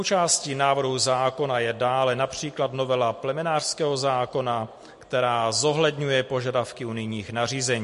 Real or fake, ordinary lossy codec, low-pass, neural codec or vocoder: real; MP3, 48 kbps; 14.4 kHz; none